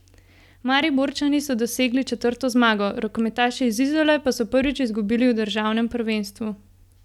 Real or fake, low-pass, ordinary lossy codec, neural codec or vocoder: real; 19.8 kHz; none; none